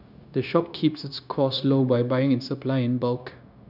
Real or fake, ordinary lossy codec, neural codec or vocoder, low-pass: fake; none; codec, 16 kHz, 0.9 kbps, LongCat-Audio-Codec; 5.4 kHz